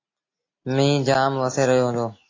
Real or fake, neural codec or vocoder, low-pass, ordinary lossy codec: real; none; 7.2 kHz; AAC, 32 kbps